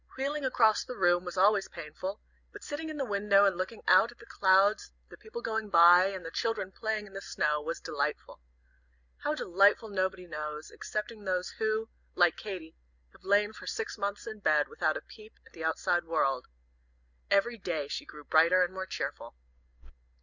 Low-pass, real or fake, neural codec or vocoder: 7.2 kHz; real; none